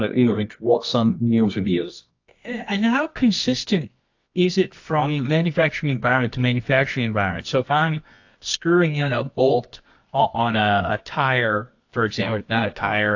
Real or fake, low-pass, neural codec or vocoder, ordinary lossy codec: fake; 7.2 kHz; codec, 24 kHz, 0.9 kbps, WavTokenizer, medium music audio release; AAC, 48 kbps